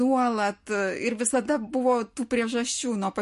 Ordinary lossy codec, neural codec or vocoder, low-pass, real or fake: MP3, 48 kbps; none; 14.4 kHz; real